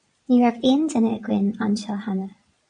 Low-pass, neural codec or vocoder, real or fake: 9.9 kHz; vocoder, 22.05 kHz, 80 mel bands, Vocos; fake